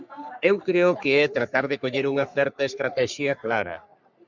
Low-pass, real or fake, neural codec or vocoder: 7.2 kHz; fake; codec, 44.1 kHz, 3.4 kbps, Pupu-Codec